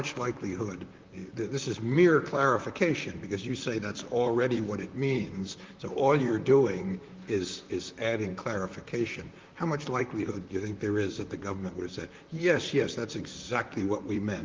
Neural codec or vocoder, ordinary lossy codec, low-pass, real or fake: vocoder, 44.1 kHz, 128 mel bands, Pupu-Vocoder; Opus, 32 kbps; 7.2 kHz; fake